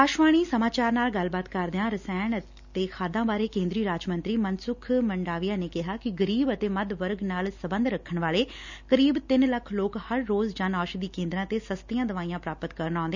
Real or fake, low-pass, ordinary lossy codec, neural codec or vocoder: real; 7.2 kHz; none; none